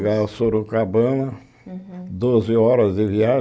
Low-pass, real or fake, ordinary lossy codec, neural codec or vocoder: none; real; none; none